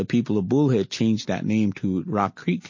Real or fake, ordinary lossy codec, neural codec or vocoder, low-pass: real; MP3, 32 kbps; none; 7.2 kHz